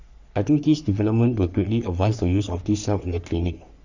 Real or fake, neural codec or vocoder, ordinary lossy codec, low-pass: fake; codec, 44.1 kHz, 3.4 kbps, Pupu-Codec; none; 7.2 kHz